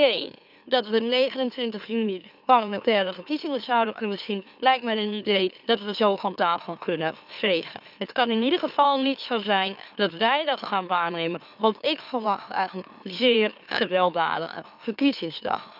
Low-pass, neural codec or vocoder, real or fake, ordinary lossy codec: 5.4 kHz; autoencoder, 44.1 kHz, a latent of 192 numbers a frame, MeloTTS; fake; none